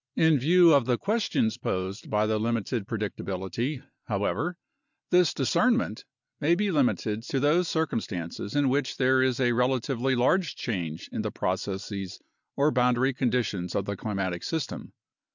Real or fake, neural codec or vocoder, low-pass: real; none; 7.2 kHz